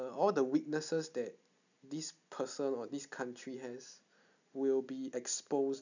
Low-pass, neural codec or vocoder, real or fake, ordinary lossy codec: 7.2 kHz; none; real; none